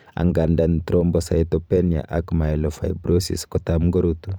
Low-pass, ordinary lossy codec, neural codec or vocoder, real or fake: none; none; vocoder, 44.1 kHz, 128 mel bands every 512 samples, BigVGAN v2; fake